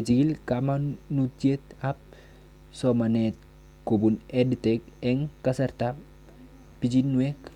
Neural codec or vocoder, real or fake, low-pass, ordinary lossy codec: none; real; 19.8 kHz; Opus, 64 kbps